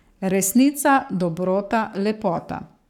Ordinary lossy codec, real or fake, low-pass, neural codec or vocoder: none; fake; 19.8 kHz; codec, 44.1 kHz, 7.8 kbps, Pupu-Codec